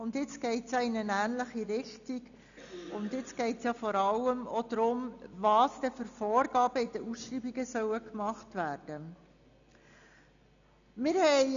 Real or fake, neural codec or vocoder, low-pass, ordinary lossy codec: real; none; 7.2 kHz; none